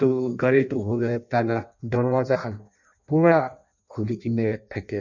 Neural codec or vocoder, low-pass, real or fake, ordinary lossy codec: codec, 16 kHz in and 24 kHz out, 0.6 kbps, FireRedTTS-2 codec; 7.2 kHz; fake; none